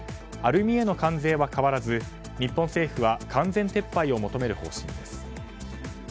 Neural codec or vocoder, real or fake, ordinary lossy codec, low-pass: none; real; none; none